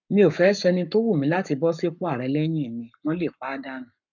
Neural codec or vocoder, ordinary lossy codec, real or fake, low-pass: codec, 44.1 kHz, 7.8 kbps, Pupu-Codec; none; fake; 7.2 kHz